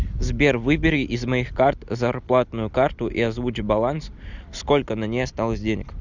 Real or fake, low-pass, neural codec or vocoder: real; 7.2 kHz; none